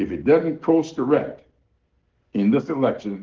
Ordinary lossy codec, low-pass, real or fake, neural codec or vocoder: Opus, 16 kbps; 7.2 kHz; real; none